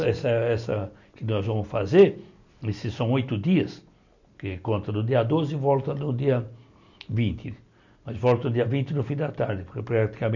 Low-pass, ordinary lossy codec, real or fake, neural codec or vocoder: 7.2 kHz; none; real; none